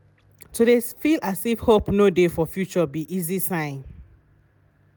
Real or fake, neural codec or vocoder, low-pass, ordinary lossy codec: real; none; none; none